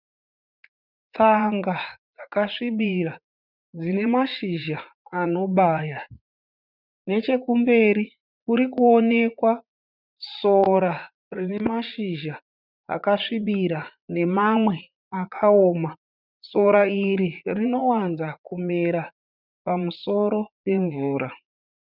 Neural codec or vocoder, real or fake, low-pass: vocoder, 44.1 kHz, 80 mel bands, Vocos; fake; 5.4 kHz